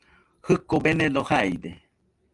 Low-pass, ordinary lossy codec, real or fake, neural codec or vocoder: 10.8 kHz; Opus, 32 kbps; real; none